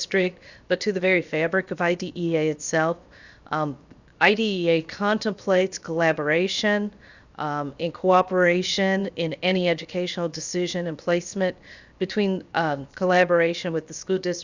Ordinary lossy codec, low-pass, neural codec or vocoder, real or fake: Opus, 64 kbps; 7.2 kHz; codec, 16 kHz, 0.7 kbps, FocalCodec; fake